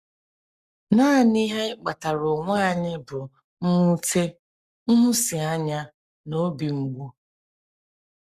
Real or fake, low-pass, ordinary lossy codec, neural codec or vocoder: fake; 14.4 kHz; Opus, 64 kbps; codec, 44.1 kHz, 7.8 kbps, Pupu-Codec